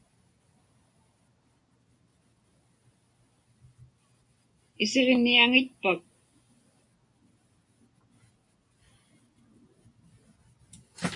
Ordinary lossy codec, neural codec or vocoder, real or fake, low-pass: MP3, 64 kbps; none; real; 10.8 kHz